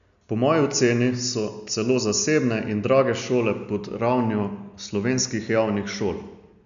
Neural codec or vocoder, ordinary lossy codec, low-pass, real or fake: none; none; 7.2 kHz; real